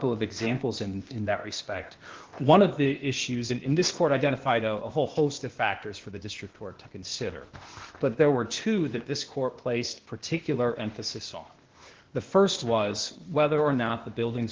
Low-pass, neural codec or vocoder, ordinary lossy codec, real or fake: 7.2 kHz; codec, 16 kHz, 0.8 kbps, ZipCodec; Opus, 16 kbps; fake